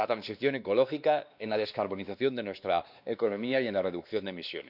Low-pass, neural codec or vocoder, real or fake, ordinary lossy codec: 5.4 kHz; codec, 16 kHz, 2 kbps, X-Codec, WavLM features, trained on Multilingual LibriSpeech; fake; none